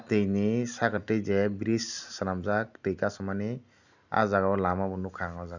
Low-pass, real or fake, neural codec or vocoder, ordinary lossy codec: 7.2 kHz; real; none; none